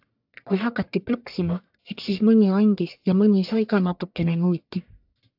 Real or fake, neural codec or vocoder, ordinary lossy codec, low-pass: fake; codec, 44.1 kHz, 1.7 kbps, Pupu-Codec; AAC, 48 kbps; 5.4 kHz